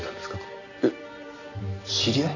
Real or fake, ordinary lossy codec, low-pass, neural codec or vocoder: real; none; 7.2 kHz; none